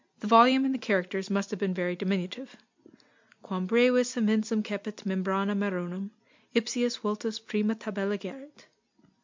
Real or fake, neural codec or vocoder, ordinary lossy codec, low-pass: real; none; MP3, 64 kbps; 7.2 kHz